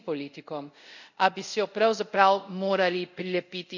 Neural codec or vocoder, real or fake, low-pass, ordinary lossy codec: codec, 24 kHz, 0.5 kbps, DualCodec; fake; 7.2 kHz; none